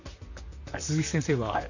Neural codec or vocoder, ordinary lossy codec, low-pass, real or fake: vocoder, 44.1 kHz, 128 mel bands, Pupu-Vocoder; none; 7.2 kHz; fake